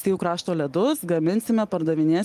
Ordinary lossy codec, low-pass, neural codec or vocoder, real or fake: Opus, 32 kbps; 14.4 kHz; none; real